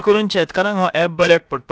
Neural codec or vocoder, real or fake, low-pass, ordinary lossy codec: codec, 16 kHz, about 1 kbps, DyCAST, with the encoder's durations; fake; none; none